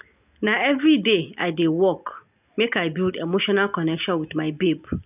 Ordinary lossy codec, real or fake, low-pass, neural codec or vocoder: none; real; 3.6 kHz; none